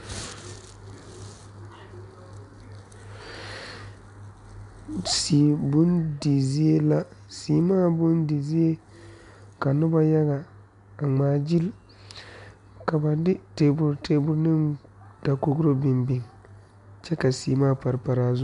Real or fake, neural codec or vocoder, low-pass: real; none; 10.8 kHz